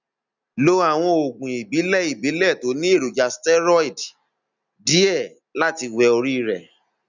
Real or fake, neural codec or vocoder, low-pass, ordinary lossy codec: real; none; 7.2 kHz; none